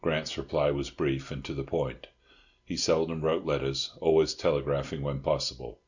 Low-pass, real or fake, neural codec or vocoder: 7.2 kHz; real; none